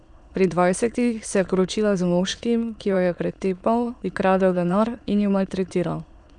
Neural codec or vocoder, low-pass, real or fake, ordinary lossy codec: autoencoder, 22.05 kHz, a latent of 192 numbers a frame, VITS, trained on many speakers; 9.9 kHz; fake; none